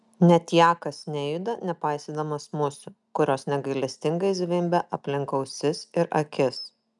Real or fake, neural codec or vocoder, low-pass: real; none; 10.8 kHz